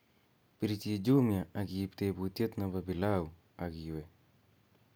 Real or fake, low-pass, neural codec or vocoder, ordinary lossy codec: real; none; none; none